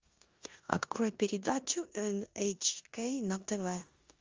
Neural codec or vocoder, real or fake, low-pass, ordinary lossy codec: codec, 16 kHz in and 24 kHz out, 0.9 kbps, LongCat-Audio-Codec, four codebook decoder; fake; 7.2 kHz; Opus, 32 kbps